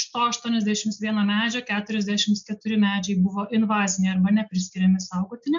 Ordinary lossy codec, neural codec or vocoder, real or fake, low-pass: MP3, 64 kbps; none; real; 7.2 kHz